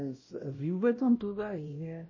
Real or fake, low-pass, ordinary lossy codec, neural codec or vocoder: fake; 7.2 kHz; MP3, 32 kbps; codec, 16 kHz, 0.5 kbps, X-Codec, WavLM features, trained on Multilingual LibriSpeech